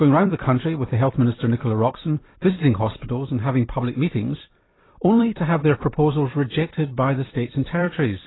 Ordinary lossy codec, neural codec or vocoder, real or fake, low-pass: AAC, 16 kbps; vocoder, 44.1 kHz, 128 mel bands every 256 samples, BigVGAN v2; fake; 7.2 kHz